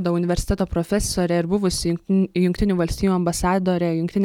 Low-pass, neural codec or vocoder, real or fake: 19.8 kHz; none; real